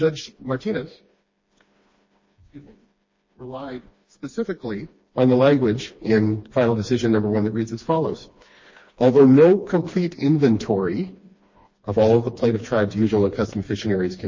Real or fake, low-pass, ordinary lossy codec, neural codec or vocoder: fake; 7.2 kHz; MP3, 32 kbps; codec, 16 kHz, 2 kbps, FreqCodec, smaller model